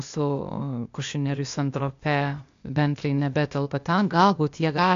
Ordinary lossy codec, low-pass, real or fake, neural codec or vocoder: AAC, 64 kbps; 7.2 kHz; fake; codec, 16 kHz, 0.8 kbps, ZipCodec